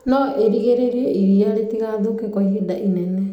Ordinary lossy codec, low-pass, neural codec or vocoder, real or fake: none; 19.8 kHz; none; real